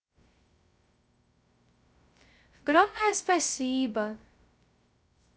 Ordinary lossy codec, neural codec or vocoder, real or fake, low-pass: none; codec, 16 kHz, 0.2 kbps, FocalCodec; fake; none